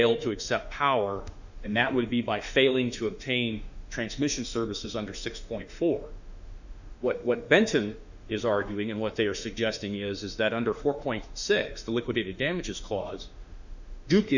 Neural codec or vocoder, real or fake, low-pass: autoencoder, 48 kHz, 32 numbers a frame, DAC-VAE, trained on Japanese speech; fake; 7.2 kHz